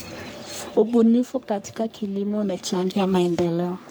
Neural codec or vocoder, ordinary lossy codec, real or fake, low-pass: codec, 44.1 kHz, 3.4 kbps, Pupu-Codec; none; fake; none